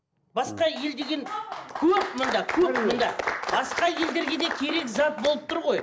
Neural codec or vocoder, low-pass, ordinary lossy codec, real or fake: none; none; none; real